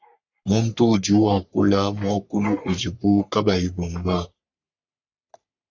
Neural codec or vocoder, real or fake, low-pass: codec, 44.1 kHz, 3.4 kbps, Pupu-Codec; fake; 7.2 kHz